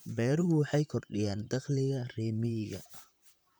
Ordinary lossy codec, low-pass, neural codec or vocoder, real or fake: none; none; vocoder, 44.1 kHz, 128 mel bands, Pupu-Vocoder; fake